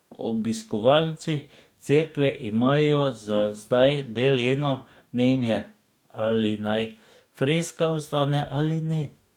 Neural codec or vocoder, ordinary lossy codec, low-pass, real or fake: codec, 44.1 kHz, 2.6 kbps, DAC; none; 19.8 kHz; fake